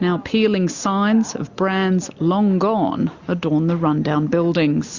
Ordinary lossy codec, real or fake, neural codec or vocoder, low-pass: Opus, 64 kbps; real; none; 7.2 kHz